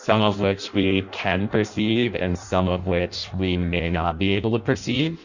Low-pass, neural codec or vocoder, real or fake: 7.2 kHz; codec, 16 kHz in and 24 kHz out, 0.6 kbps, FireRedTTS-2 codec; fake